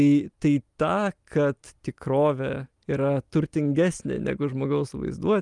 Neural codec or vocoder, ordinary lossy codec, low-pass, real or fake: none; Opus, 32 kbps; 10.8 kHz; real